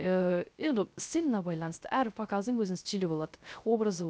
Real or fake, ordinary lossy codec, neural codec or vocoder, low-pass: fake; none; codec, 16 kHz, 0.3 kbps, FocalCodec; none